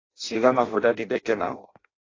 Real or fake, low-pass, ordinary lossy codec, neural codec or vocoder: fake; 7.2 kHz; AAC, 32 kbps; codec, 16 kHz in and 24 kHz out, 0.6 kbps, FireRedTTS-2 codec